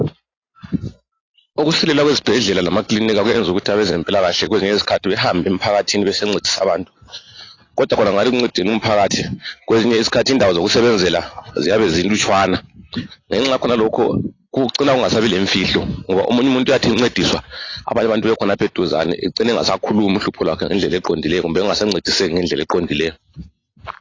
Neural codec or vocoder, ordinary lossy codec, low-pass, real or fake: none; AAC, 32 kbps; 7.2 kHz; real